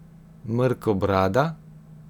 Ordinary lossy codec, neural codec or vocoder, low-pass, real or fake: none; none; 19.8 kHz; real